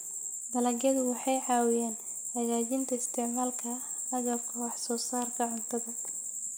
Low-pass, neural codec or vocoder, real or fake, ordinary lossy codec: none; none; real; none